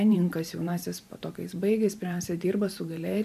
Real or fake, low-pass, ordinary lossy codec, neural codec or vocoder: fake; 14.4 kHz; MP3, 96 kbps; vocoder, 44.1 kHz, 128 mel bands every 512 samples, BigVGAN v2